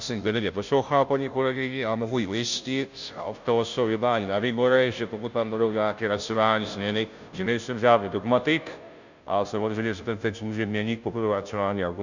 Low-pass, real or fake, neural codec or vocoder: 7.2 kHz; fake; codec, 16 kHz, 0.5 kbps, FunCodec, trained on Chinese and English, 25 frames a second